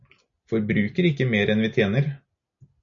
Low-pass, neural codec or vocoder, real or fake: 7.2 kHz; none; real